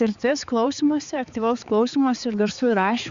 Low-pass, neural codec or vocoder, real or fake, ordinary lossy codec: 7.2 kHz; codec, 16 kHz, 4 kbps, X-Codec, HuBERT features, trained on balanced general audio; fake; Opus, 64 kbps